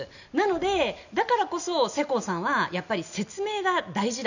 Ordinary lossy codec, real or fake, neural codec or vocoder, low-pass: none; real; none; 7.2 kHz